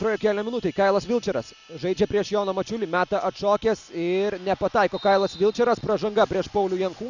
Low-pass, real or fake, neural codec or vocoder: 7.2 kHz; real; none